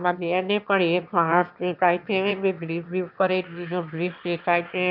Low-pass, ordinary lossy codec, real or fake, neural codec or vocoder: 5.4 kHz; none; fake; autoencoder, 22.05 kHz, a latent of 192 numbers a frame, VITS, trained on one speaker